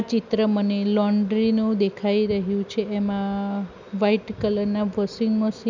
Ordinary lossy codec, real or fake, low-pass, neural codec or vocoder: none; real; 7.2 kHz; none